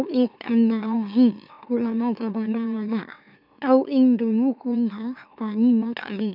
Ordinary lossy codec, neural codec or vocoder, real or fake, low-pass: none; autoencoder, 44.1 kHz, a latent of 192 numbers a frame, MeloTTS; fake; 5.4 kHz